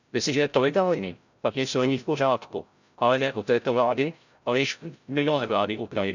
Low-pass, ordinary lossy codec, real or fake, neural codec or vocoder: 7.2 kHz; none; fake; codec, 16 kHz, 0.5 kbps, FreqCodec, larger model